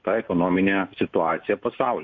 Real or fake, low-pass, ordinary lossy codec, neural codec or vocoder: real; 7.2 kHz; MP3, 48 kbps; none